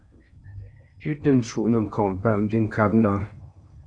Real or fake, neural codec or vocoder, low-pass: fake; codec, 16 kHz in and 24 kHz out, 0.6 kbps, FocalCodec, streaming, 4096 codes; 9.9 kHz